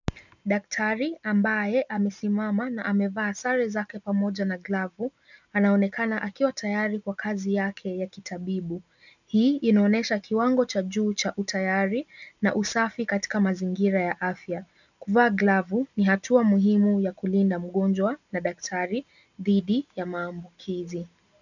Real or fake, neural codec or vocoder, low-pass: real; none; 7.2 kHz